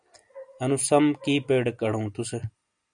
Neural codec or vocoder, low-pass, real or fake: none; 9.9 kHz; real